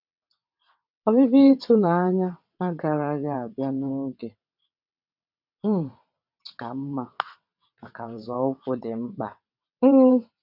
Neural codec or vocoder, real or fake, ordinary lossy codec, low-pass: vocoder, 22.05 kHz, 80 mel bands, WaveNeXt; fake; none; 5.4 kHz